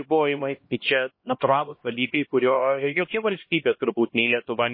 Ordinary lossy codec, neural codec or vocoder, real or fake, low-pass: MP3, 24 kbps; codec, 16 kHz, 1 kbps, X-Codec, HuBERT features, trained on LibriSpeech; fake; 5.4 kHz